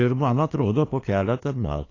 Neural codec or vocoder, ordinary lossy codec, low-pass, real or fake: codec, 16 kHz, 2 kbps, FunCodec, trained on LibriTTS, 25 frames a second; AAC, 32 kbps; 7.2 kHz; fake